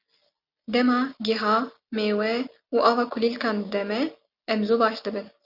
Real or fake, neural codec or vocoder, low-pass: real; none; 5.4 kHz